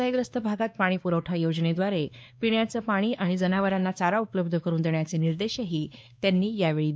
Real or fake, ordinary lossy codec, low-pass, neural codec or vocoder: fake; none; none; codec, 16 kHz, 2 kbps, X-Codec, WavLM features, trained on Multilingual LibriSpeech